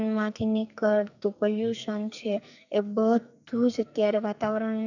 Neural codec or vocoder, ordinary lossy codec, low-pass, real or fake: codec, 44.1 kHz, 2.6 kbps, SNAC; none; 7.2 kHz; fake